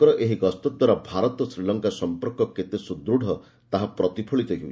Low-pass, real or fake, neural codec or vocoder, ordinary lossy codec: none; real; none; none